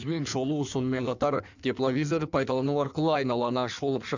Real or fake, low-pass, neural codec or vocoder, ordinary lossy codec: fake; 7.2 kHz; codec, 16 kHz in and 24 kHz out, 1.1 kbps, FireRedTTS-2 codec; none